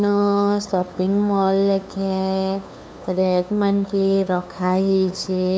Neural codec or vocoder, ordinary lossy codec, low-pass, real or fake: codec, 16 kHz, 2 kbps, FunCodec, trained on LibriTTS, 25 frames a second; none; none; fake